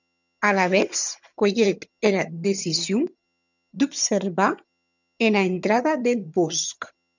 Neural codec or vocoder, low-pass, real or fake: vocoder, 22.05 kHz, 80 mel bands, HiFi-GAN; 7.2 kHz; fake